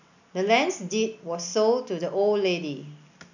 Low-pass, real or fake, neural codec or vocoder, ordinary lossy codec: 7.2 kHz; real; none; none